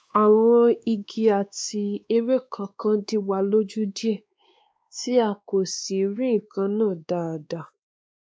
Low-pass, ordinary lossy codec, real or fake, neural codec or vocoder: none; none; fake; codec, 16 kHz, 2 kbps, X-Codec, WavLM features, trained on Multilingual LibriSpeech